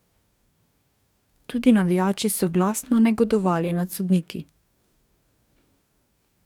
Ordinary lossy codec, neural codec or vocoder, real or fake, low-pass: none; codec, 44.1 kHz, 2.6 kbps, DAC; fake; 19.8 kHz